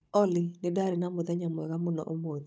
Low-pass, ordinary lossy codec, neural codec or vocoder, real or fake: none; none; codec, 16 kHz, 16 kbps, FunCodec, trained on Chinese and English, 50 frames a second; fake